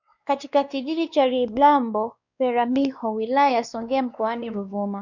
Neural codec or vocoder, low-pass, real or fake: codec, 16 kHz, 2 kbps, X-Codec, WavLM features, trained on Multilingual LibriSpeech; 7.2 kHz; fake